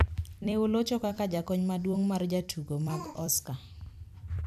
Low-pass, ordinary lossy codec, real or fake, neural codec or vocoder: 14.4 kHz; none; fake; vocoder, 44.1 kHz, 128 mel bands every 256 samples, BigVGAN v2